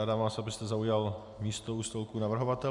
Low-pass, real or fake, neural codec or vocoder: 10.8 kHz; real; none